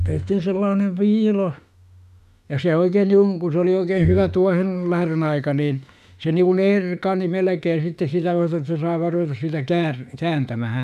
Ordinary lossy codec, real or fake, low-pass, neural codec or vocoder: none; fake; 14.4 kHz; autoencoder, 48 kHz, 32 numbers a frame, DAC-VAE, trained on Japanese speech